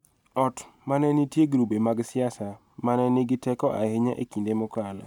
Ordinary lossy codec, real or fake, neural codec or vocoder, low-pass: none; real; none; 19.8 kHz